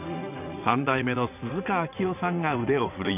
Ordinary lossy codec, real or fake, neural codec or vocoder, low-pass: none; fake; vocoder, 22.05 kHz, 80 mel bands, WaveNeXt; 3.6 kHz